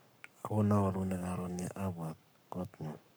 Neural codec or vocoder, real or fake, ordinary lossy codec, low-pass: codec, 44.1 kHz, 7.8 kbps, Pupu-Codec; fake; none; none